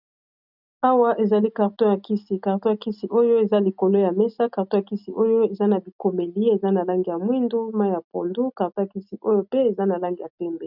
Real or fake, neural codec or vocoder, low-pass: real; none; 5.4 kHz